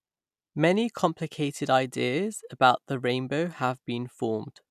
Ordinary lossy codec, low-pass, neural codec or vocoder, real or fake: none; 14.4 kHz; none; real